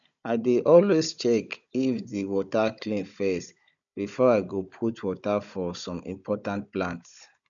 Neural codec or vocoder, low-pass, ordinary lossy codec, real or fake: codec, 16 kHz, 16 kbps, FunCodec, trained on Chinese and English, 50 frames a second; 7.2 kHz; none; fake